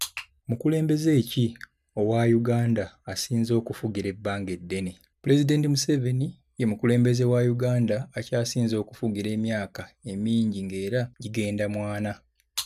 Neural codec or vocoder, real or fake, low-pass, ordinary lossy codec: none; real; 14.4 kHz; none